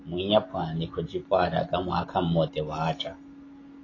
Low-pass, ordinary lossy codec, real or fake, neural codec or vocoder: 7.2 kHz; AAC, 32 kbps; real; none